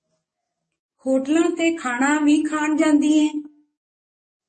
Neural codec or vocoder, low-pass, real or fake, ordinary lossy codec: codec, 44.1 kHz, 7.8 kbps, DAC; 10.8 kHz; fake; MP3, 32 kbps